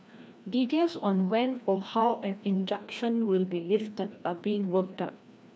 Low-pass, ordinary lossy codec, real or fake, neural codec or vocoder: none; none; fake; codec, 16 kHz, 1 kbps, FreqCodec, larger model